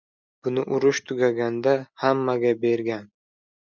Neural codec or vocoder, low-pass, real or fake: none; 7.2 kHz; real